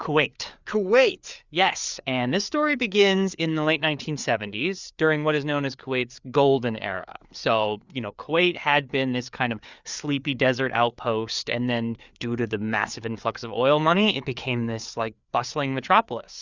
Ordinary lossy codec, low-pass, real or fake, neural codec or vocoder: Opus, 64 kbps; 7.2 kHz; fake; codec, 16 kHz, 4 kbps, FreqCodec, larger model